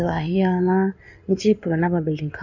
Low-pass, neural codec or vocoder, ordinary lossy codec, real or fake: 7.2 kHz; codec, 16 kHz in and 24 kHz out, 2.2 kbps, FireRedTTS-2 codec; none; fake